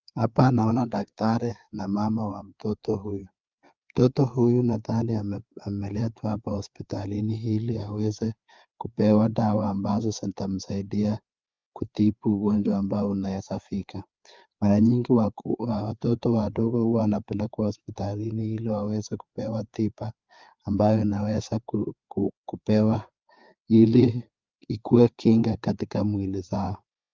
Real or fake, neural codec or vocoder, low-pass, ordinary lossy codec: fake; codec, 16 kHz, 4 kbps, FreqCodec, larger model; 7.2 kHz; Opus, 24 kbps